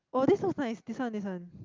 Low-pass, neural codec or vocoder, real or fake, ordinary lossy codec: 7.2 kHz; none; real; Opus, 24 kbps